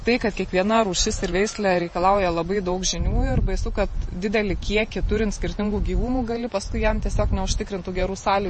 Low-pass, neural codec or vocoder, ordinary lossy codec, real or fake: 10.8 kHz; vocoder, 48 kHz, 128 mel bands, Vocos; MP3, 32 kbps; fake